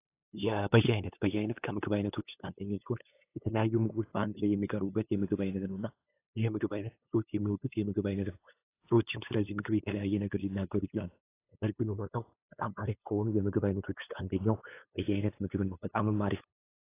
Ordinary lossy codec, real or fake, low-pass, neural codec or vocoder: AAC, 24 kbps; fake; 3.6 kHz; codec, 16 kHz, 8 kbps, FunCodec, trained on LibriTTS, 25 frames a second